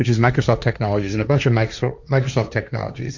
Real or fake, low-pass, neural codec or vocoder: fake; 7.2 kHz; codec, 16 kHz, 1.1 kbps, Voila-Tokenizer